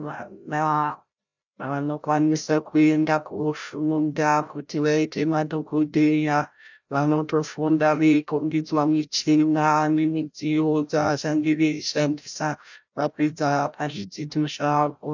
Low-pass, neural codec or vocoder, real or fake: 7.2 kHz; codec, 16 kHz, 0.5 kbps, FreqCodec, larger model; fake